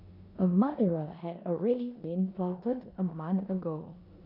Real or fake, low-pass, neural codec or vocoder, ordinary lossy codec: fake; 5.4 kHz; codec, 16 kHz in and 24 kHz out, 0.9 kbps, LongCat-Audio-Codec, four codebook decoder; none